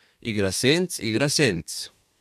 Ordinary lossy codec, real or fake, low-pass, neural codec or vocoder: none; fake; 14.4 kHz; codec, 32 kHz, 1.9 kbps, SNAC